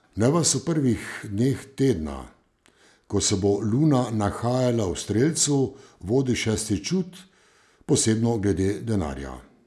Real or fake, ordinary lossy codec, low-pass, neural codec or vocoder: real; none; none; none